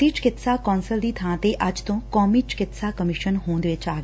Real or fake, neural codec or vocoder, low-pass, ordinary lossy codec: real; none; none; none